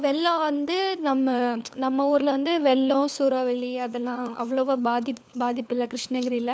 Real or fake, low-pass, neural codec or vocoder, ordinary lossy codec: fake; none; codec, 16 kHz, 4 kbps, FunCodec, trained on LibriTTS, 50 frames a second; none